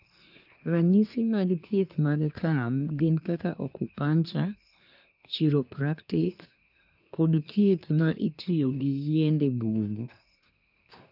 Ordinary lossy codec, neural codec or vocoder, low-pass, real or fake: none; codec, 24 kHz, 1 kbps, SNAC; 5.4 kHz; fake